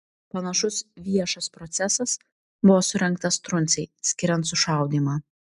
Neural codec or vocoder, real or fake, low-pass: none; real; 10.8 kHz